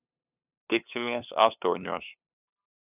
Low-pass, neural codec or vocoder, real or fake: 3.6 kHz; codec, 16 kHz, 2 kbps, FunCodec, trained on LibriTTS, 25 frames a second; fake